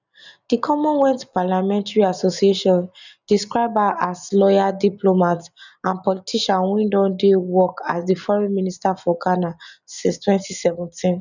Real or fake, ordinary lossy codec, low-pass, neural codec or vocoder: real; none; 7.2 kHz; none